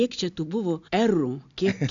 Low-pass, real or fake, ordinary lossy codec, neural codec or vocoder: 7.2 kHz; real; AAC, 64 kbps; none